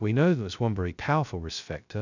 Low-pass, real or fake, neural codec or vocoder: 7.2 kHz; fake; codec, 16 kHz, 0.2 kbps, FocalCodec